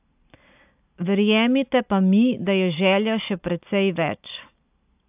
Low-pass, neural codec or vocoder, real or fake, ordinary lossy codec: 3.6 kHz; none; real; AAC, 32 kbps